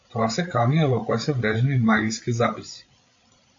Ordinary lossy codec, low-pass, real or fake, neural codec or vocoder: AAC, 48 kbps; 7.2 kHz; fake; codec, 16 kHz, 8 kbps, FreqCodec, larger model